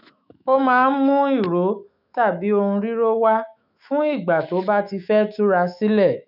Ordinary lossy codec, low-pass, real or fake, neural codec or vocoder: none; 5.4 kHz; fake; autoencoder, 48 kHz, 128 numbers a frame, DAC-VAE, trained on Japanese speech